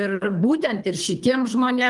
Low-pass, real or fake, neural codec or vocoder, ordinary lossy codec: 10.8 kHz; fake; codec, 24 kHz, 3 kbps, HILCodec; Opus, 32 kbps